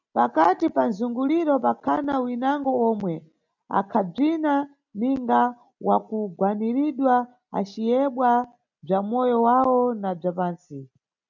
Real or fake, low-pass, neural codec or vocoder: real; 7.2 kHz; none